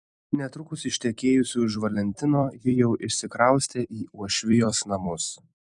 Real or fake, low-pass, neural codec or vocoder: fake; 10.8 kHz; vocoder, 44.1 kHz, 128 mel bands every 256 samples, BigVGAN v2